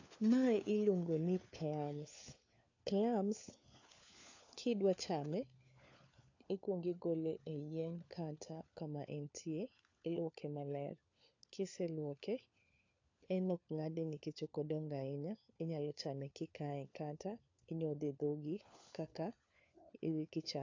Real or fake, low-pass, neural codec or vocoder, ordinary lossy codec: fake; 7.2 kHz; codec, 16 kHz, 4 kbps, FunCodec, trained on LibriTTS, 50 frames a second; AAC, 48 kbps